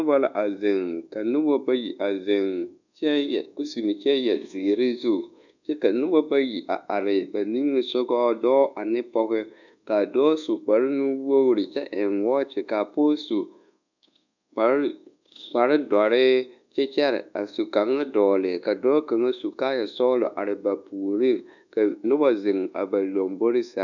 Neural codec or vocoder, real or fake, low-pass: codec, 24 kHz, 1.2 kbps, DualCodec; fake; 7.2 kHz